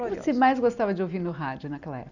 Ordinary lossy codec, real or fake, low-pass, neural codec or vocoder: none; real; 7.2 kHz; none